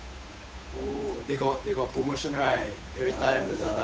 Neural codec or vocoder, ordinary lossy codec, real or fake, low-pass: codec, 16 kHz, 8 kbps, FunCodec, trained on Chinese and English, 25 frames a second; none; fake; none